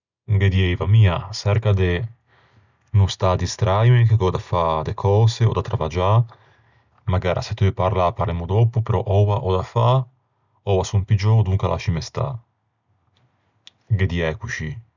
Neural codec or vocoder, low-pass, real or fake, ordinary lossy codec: none; 7.2 kHz; real; none